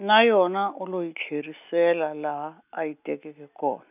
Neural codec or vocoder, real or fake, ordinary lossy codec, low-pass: none; real; none; 3.6 kHz